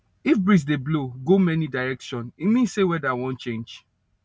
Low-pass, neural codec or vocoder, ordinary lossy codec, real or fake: none; none; none; real